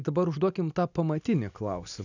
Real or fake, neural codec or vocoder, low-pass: real; none; 7.2 kHz